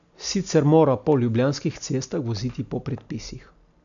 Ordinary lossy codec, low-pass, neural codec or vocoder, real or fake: none; 7.2 kHz; none; real